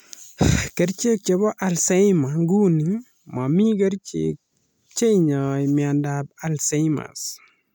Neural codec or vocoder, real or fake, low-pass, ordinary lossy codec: none; real; none; none